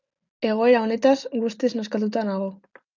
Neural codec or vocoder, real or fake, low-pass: none; real; 7.2 kHz